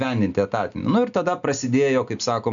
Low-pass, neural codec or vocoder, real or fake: 7.2 kHz; none; real